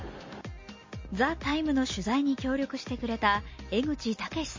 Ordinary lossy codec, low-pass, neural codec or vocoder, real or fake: MP3, 32 kbps; 7.2 kHz; none; real